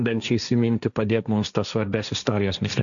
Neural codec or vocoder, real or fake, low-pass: codec, 16 kHz, 1.1 kbps, Voila-Tokenizer; fake; 7.2 kHz